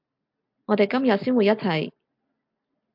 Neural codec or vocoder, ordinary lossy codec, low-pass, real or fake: none; MP3, 48 kbps; 5.4 kHz; real